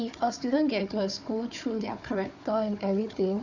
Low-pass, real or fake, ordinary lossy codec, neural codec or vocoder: 7.2 kHz; fake; none; codec, 16 kHz, 4 kbps, FunCodec, trained on Chinese and English, 50 frames a second